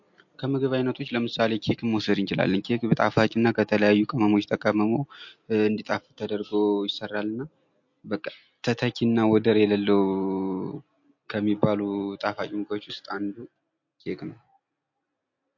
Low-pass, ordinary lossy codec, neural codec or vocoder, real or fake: 7.2 kHz; MP3, 48 kbps; none; real